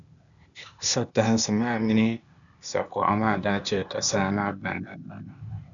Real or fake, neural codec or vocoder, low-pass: fake; codec, 16 kHz, 0.8 kbps, ZipCodec; 7.2 kHz